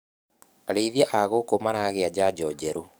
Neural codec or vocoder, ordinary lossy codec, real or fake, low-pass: codec, 44.1 kHz, 7.8 kbps, DAC; none; fake; none